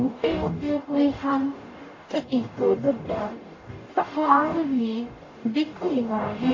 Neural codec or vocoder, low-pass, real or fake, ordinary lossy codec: codec, 44.1 kHz, 0.9 kbps, DAC; 7.2 kHz; fake; AAC, 32 kbps